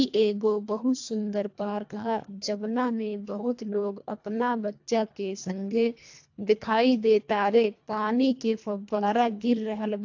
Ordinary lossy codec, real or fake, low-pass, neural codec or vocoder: AAC, 48 kbps; fake; 7.2 kHz; codec, 24 kHz, 1.5 kbps, HILCodec